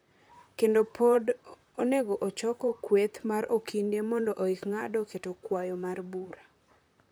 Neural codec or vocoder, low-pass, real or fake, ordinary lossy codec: vocoder, 44.1 kHz, 128 mel bands, Pupu-Vocoder; none; fake; none